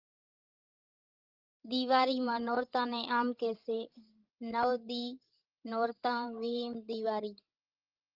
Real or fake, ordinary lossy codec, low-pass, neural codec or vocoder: fake; Opus, 32 kbps; 5.4 kHz; codec, 16 kHz, 16 kbps, FreqCodec, larger model